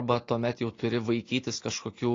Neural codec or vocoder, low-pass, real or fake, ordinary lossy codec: none; 7.2 kHz; real; AAC, 32 kbps